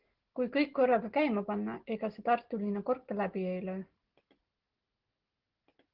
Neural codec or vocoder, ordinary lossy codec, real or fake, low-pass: none; Opus, 16 kbps; real; 5.4 kHz